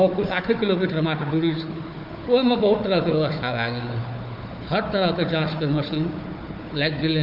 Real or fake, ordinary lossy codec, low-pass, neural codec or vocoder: fake; MP3, 48 kbps; 5.4 kHz; codec, 16 kHz, 4 kbps, FunCodec, trained on Chinese and English, 50 frames a second